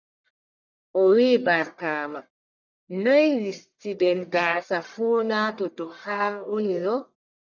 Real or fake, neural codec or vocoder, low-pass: fake; codec, 44.1 kHz, 1.7 kbps, Pupu-Codec; 7.2 kHz